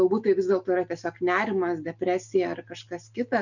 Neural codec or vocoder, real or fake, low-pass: none; real; 7.2 kHz